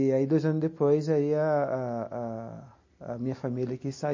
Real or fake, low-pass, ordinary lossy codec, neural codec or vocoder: real; 7.2 kHz; MP3, 32 kbps; none